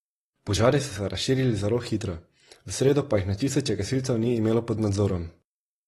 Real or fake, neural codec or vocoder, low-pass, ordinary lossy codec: real; none; 19.8 kHz; AAC, 32 kbps